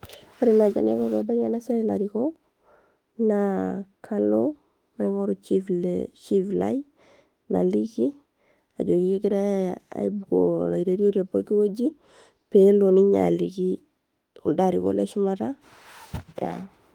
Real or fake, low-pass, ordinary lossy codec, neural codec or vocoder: fake; 19.8 kHz; Opus, 32 kbps; autoencoder, 48 kHz, 32 numbers a frame, DAC-VAE, trained on Japanese speech